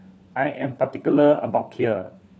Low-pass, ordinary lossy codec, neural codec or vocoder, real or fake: none; none; codec, 16 kHz, 4 kbps, FunCodec, trained on LibriTTS, 50 frames a second; fake